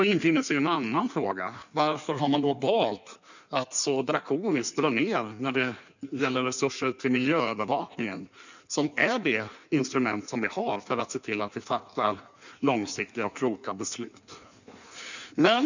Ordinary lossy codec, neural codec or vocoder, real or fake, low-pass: none; codec, 16 kHz in and 24 kHz out, 1.1 kbps, FireRedTTS-2 codec; fake; 7.2 kHz